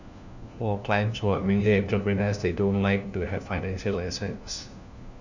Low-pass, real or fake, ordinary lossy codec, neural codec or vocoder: 7.2 kHz; fake; none; codec, 16 kHz, 1 kbps, FunCodec, trained on LibriTTS, 50 frames a second